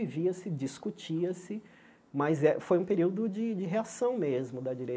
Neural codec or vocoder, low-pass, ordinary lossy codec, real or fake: none; none; none; real